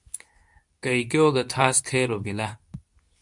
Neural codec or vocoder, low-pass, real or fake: codec, 24 kHz, 0.9 kbps, WavTokenizer, medium speech release version 2; 10.8 kHz; fake